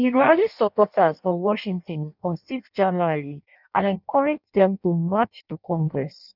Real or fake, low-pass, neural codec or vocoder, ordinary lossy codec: fake; 5.4 kHz; codec, 16 kHz in and 24 kHz out, 0.6 kbps, FireRedTTS-2 codec; none